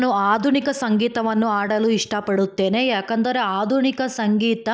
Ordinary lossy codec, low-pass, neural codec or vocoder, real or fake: none; none; none; real